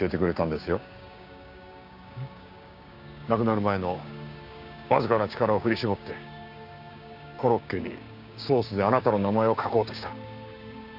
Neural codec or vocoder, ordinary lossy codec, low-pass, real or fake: codec, 16 kHz, 6 kbps, DAC; none; 5.4 kHz; fake